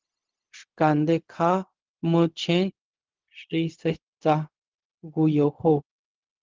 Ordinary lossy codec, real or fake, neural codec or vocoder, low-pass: Opus, 16 kbps; fake; codec, 16 kHz, 0.4 kbps, LongCat-Audio-Codec; 7.2 kHz